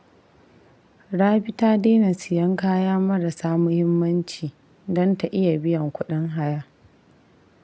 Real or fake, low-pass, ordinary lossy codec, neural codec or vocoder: real; none; none; none